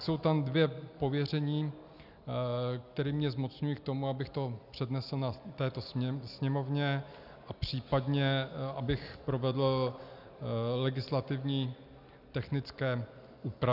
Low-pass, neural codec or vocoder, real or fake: 5.4 kHz; none; real